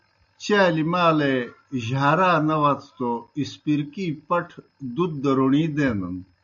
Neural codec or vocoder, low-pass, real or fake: none; 7.2 kHz; real